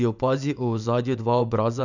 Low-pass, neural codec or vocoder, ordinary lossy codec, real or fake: 7.2 kHz; vocoder, 24 kHz, 100 mel bands, Vocos; none; fake